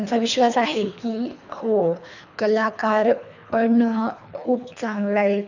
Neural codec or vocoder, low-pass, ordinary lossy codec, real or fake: codec, 24 kHz, 3 kbps, HILCodec; 7.2 kHz; none; fake